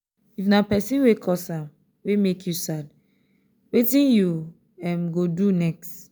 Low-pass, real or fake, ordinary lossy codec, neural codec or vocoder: none; real; none; none